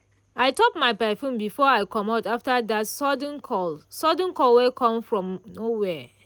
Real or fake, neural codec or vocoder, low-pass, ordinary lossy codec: real; none; none; none